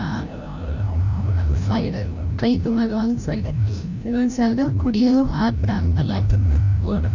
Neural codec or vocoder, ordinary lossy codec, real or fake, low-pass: codec, 16 kHz, 0.5 kbps, FreqCodec, larger model; none; fake; 7.2 kHz